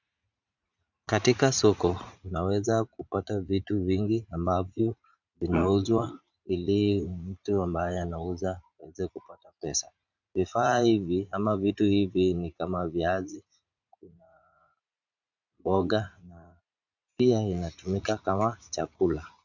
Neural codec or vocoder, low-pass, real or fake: vocoder, 44.1 kHz, 80 mel bands, Vocos; 7.2 kHz; fake